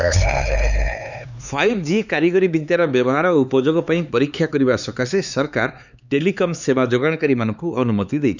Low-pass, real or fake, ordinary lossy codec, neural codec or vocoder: 7.2 kHz; fake; none; codec, 16 kHz, 4 kbps, X-Codec, HuBERT features, trained on LibriSpeech